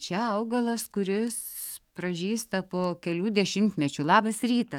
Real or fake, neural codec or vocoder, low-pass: fake; codec, 44.1 kHz, 7.8 kbps, DAC; 19.8 kHz